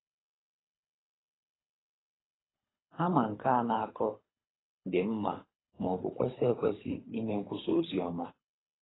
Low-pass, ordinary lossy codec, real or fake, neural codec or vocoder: 7.2 kHz; AAC, 16 kbps; fake; codec, 24 kHz, 3 kbps, HILCodec